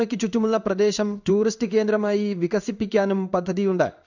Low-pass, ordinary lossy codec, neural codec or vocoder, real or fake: 7.2 kHz; none; codec, 16 kHz in and 24 kHz out, 1 kbps, XY-Tokenizer; fake